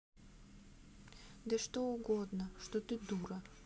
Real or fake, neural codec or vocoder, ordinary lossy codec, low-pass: real; none; none; none